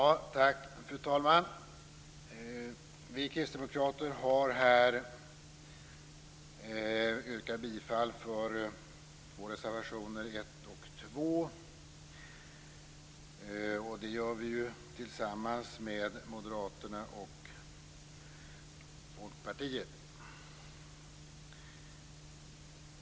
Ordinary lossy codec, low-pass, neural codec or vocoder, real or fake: none; none; none; real